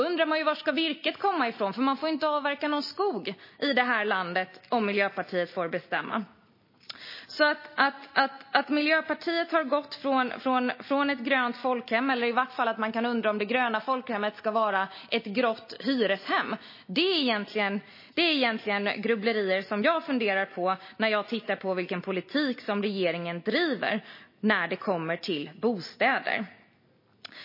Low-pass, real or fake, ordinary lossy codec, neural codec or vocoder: 5.4 kHz; real; MP3, 24 kbps; none